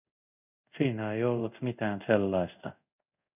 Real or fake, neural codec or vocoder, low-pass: fake; codec, 24 kHz, 0.5 kbps, DualCodec; 3.6 kHz